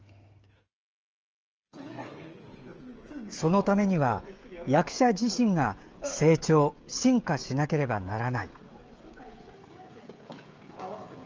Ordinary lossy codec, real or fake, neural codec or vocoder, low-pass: Opus, 24 kbps; fake; codec, 16 kHz, 16 kbps, FreqCodec, smaller model; 7.2 kHz